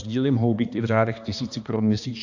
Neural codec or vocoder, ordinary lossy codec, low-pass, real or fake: codec, 16 kHz, 2 kbps, X-Codec, HuBERT features, trained on balanced general audio; AAC, 48 kbps; 7.2 kHz; fake